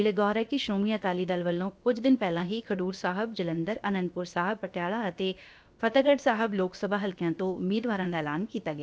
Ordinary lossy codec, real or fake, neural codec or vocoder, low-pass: none; fake; codec, 16 kHz, about 1 kbps, DyCAST, with the encoder's durations; none